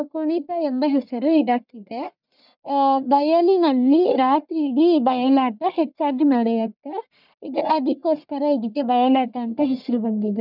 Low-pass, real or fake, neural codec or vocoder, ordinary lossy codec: 5.4 kHz; fake; codec, 44.1 kHz, 1.7 kbps, Pupu-Codec; none